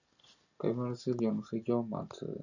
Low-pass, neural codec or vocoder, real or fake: 7.2 kHz; none; real